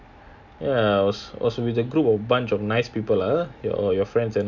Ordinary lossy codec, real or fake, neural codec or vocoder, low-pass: none; real; none; 7.2 kHz